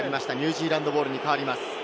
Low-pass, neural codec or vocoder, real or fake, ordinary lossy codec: none; none; real; none